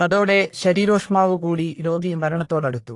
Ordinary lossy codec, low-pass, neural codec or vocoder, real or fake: AAC, 48 kbps; 10.8 kHz; codec, 44.1 kHz, 1.7 kbps, Pupu-Codec; fake